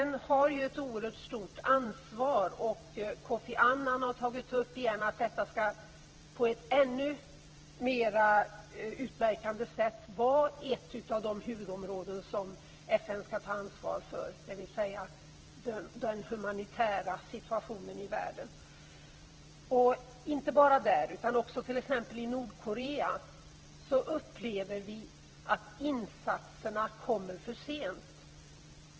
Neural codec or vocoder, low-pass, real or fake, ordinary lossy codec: vocoder, 44.1 kHz, 128 mel bands every 512 samples, BigVGAN v2; 7.2 kHz; fake; Opus, 16 kbps